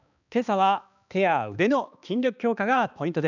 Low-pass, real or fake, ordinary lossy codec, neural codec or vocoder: 7.2 kHz; fake; none; codec, 16 kHz, 2 kbps, X-Codec, HuBERT features, trained on balanced general audio